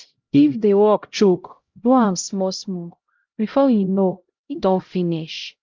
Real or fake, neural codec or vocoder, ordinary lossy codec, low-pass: fake; codec, 16 kHz, 0.5 kbps, X-Codec, HuBERT features, trained on LibriSpeech; Opus, 24 kbps; 7.2 kHz